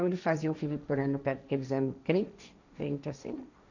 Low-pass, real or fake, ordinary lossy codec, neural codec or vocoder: 7.2 kHz; fake; none; codec, 16 kHz, 1.1 kbps, Voila-Tokenizer